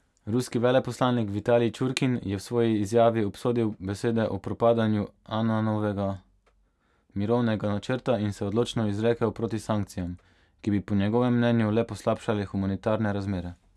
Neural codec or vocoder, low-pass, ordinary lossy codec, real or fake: none; none; none; real